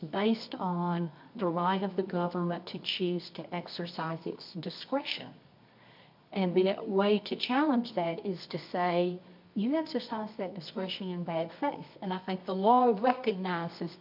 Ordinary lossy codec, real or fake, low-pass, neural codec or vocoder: MP3, 48 kbps; fake; 5.4 kHz; codec, 24 kHz, 0.9 kbps, WavTokenizer, medium music audio release